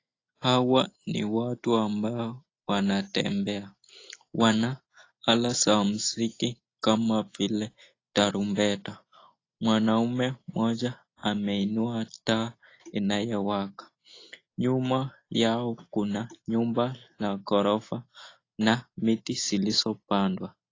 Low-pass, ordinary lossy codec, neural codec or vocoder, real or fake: 7.2 kHz; AAC, 32 kbps; none; real